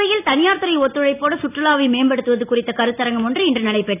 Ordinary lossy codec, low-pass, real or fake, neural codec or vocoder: none; 3.6 kHz; real; none